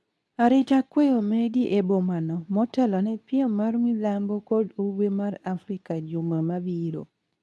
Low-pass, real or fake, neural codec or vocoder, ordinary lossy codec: none; fake; codec, 24 kHz, 0.9 kbps, WavTokenizer, medium speech release version 2; none